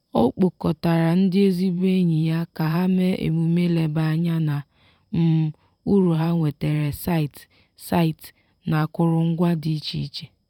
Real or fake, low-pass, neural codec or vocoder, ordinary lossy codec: fake; 19.8 kHz; vocoder, 44.1 kHz, 128 mel bands every 512 samples, BigVGAN v2; none